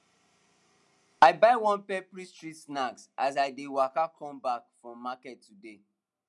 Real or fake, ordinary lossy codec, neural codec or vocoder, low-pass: real; none; none; none